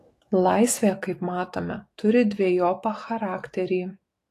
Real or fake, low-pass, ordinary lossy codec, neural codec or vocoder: fake; 14.4 kHz; AAC, 48 kbps; autoencoder, 48 kHz, 128 numbers a frame, DAC-VAE, trained on Japanese speech